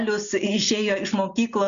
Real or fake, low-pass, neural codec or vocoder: real; 7.2 kHz; none